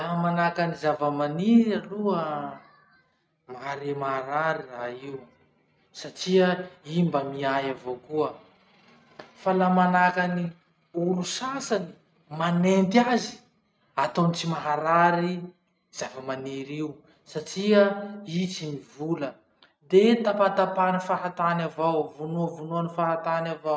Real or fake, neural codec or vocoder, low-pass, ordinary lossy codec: real; none; none; none